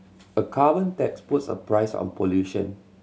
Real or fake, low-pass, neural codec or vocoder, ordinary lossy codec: real; none; none; none